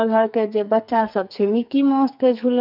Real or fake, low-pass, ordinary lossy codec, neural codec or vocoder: fake; 5.4 kHz; none; codec, 44.1 kHz, 2.6 kbps, SNAC